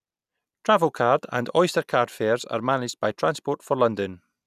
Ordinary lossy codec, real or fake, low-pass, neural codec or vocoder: none; real; 14.4 kHz; none